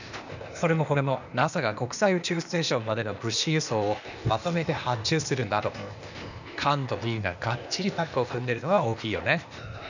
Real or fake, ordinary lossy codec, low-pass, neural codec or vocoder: fake; none; 7.2 kHz; codec, 16 kHz, 0.8 kbps, ZipCodec